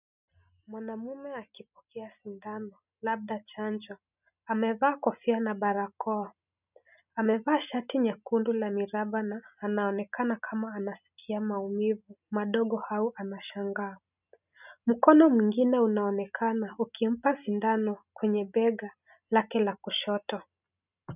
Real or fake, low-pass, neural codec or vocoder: real; 3.6 kHz; none